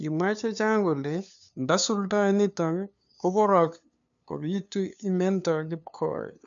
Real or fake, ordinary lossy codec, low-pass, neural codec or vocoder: fake; MP3, 96 kbps; 7.2 kHz; codec, 16 kHz, 2 kbps, FunCodec, trained on LibriTTS, 25 frames a second